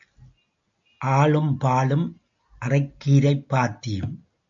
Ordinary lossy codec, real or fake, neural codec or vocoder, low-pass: AAC, 64 kbps; real; none; 7.2 kHz